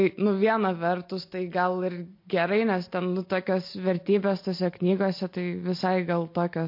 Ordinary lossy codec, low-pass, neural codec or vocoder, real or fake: MP3, 32 kbps; 5.4 kHz; none; real